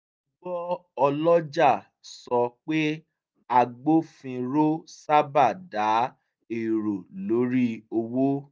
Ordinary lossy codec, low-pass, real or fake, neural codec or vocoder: none; none; real; none